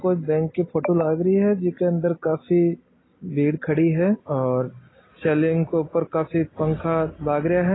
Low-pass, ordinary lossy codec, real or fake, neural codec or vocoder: 7.2 kHz; AAC, 16 kbps; real; none